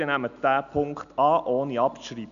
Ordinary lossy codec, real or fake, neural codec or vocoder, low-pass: none; real; none; 7.2 kHz